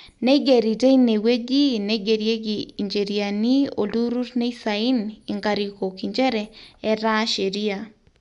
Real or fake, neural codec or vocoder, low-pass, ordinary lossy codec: real; none; 10.8 kHz; none